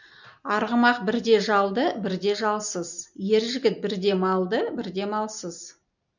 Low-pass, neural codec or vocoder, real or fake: 7.2 kHz; none; real